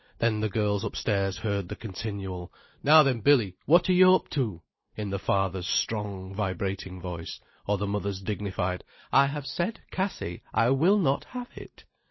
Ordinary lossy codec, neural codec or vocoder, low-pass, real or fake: MP3, 24 kbps; none; 7.2 kHz; real